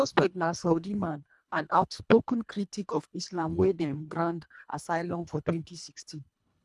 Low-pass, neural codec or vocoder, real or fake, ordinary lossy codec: none; codec, 24 kHz, 1.5 kbps, HILCodec; fake; none